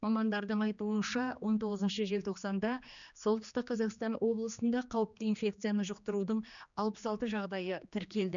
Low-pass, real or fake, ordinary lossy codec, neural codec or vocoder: 7.2 kHz; fake; none; codec, 16 kHz, 2 kbps, X-Codec, HuBERT features, trained on general audio